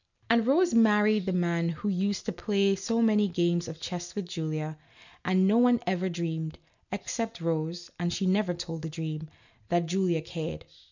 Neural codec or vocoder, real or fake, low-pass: none; real; 7.2 kHz